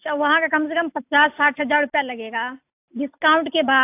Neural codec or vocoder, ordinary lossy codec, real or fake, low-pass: none; AAC, 32 kbps; real; 3.6 kHz